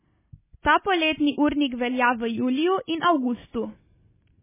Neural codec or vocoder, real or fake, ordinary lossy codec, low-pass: none; real; MP3, 16 kbps; 3.6 kHz